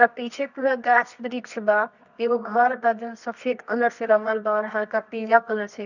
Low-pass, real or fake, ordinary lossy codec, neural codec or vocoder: 7.2 kHz; fake; none; codec, 24 kHz, 0.9 kbps, WavTokenizer, medium music audio release